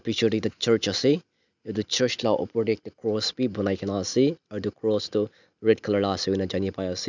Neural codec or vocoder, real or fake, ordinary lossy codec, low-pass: none; real; none; 7.2 kHz